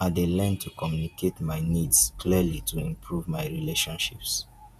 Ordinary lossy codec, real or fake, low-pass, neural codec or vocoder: none; fake; 14.4 kHz; vocoder, 48 kHz, 128 mel bands, Vocos